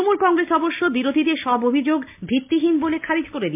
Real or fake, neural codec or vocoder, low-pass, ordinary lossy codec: real; none; 3.6 kHz; MP3, 32 kbps